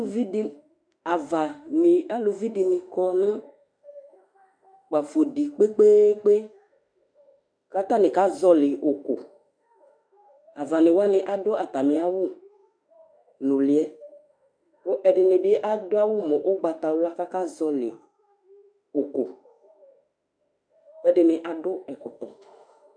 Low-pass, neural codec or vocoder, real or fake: 9.9 kHz; autoencoder, 48 kHz, 32 numbers a frame, DAC-VAE, trained on Japanese speech; fake